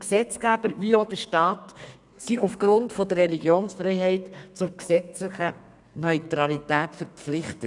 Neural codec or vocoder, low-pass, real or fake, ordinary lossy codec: codec, 32 kHz, 1.9 kbps, SNAC; 10.8 kHz; fake; none